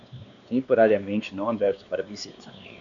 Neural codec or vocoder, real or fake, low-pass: codec, 16 kHz, 2 kbps, X-Codec, WavLM features, trained on Multilingual LibriSpeech; fake; 7.2 kHz